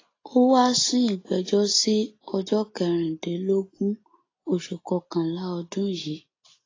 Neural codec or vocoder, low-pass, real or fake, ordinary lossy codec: none; 7.2 kHz; real; AAC, 32 kbps